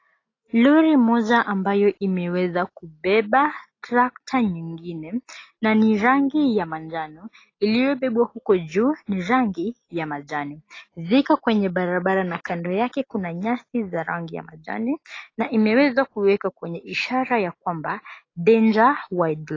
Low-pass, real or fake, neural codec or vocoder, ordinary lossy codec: 7.2 kHz; real; none; AAC, 32 kbps